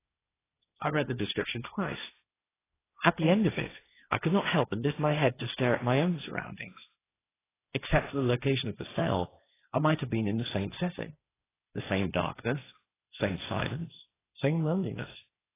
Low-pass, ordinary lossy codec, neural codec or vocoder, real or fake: 3.6 kHz; AAC, 16 kbps; codec, 16 kHz, 1.1 kbps, Voila-Tokenizer; fake